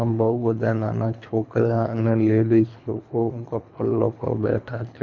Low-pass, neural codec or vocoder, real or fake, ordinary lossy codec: 7.2 kHz; codec, 24 kHz, 3 kbps, HILCodec; fake; MP3, 48 kbps